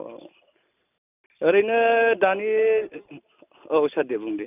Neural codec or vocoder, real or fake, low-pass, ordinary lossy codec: none; real; 3.6 kHz; none